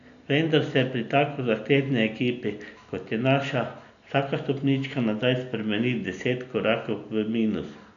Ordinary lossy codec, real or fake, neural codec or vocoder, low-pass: none; real; none; 7.2 kHz